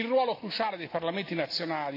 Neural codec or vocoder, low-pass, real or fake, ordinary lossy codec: none; 5.4 kHz; real; AAC, 32 kbps